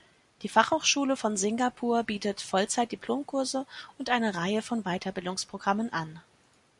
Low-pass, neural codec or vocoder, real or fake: 10.8 kHz; none; real